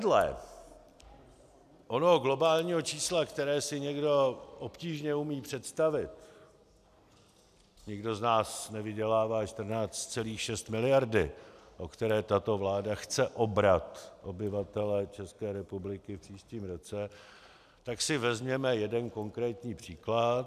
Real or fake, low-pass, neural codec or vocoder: real; 14.4 kHz; none